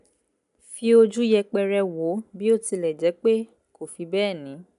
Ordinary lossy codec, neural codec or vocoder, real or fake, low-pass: none; none; real; 10.8 kHz